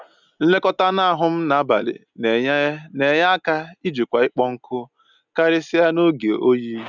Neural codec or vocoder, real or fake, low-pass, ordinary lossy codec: none; real; 7.2 kHz; none